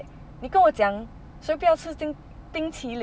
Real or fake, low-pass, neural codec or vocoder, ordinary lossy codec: real; none; none; none